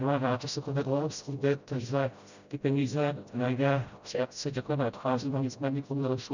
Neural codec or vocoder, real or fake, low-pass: codec, 16 kHz, 0.5 kbps, FreqCodec, smaller model; fake; 7.2 kHz